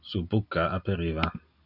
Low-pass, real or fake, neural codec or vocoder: 5.4 kHz; real; none